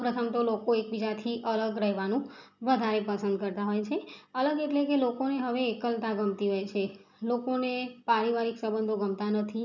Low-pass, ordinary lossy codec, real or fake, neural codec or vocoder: 7.2 kHz; none; real; none